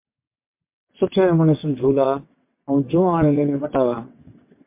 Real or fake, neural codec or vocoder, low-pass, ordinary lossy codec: fake; vocoder, 22.05 kHz, 80 mel bands, WaveNeXt; 3.6 kHz; MP3, 24 kbps